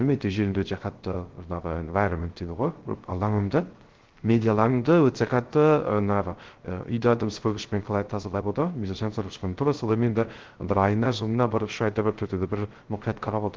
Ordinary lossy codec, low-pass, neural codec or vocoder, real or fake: Opus, 16 kbps; 7.2 kHz; codec, 16 kHz, 0.3 kbps, FocalCodec; fake